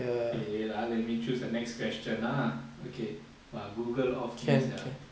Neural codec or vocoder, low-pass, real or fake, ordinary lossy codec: none; none; real; none